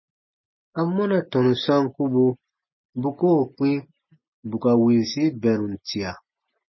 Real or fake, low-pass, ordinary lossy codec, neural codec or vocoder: real; 7.2 kHz; MP3, 24 kbps; none